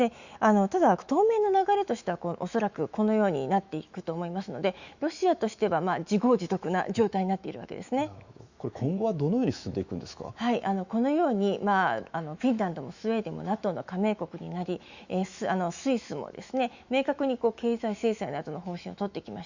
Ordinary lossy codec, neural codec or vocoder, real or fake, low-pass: Opus, 64 kbps; autoencoder, 48 kHz, 128 numbers a frame, DAC-VAE, trained on Japanese speech; fake; 7.2 kHz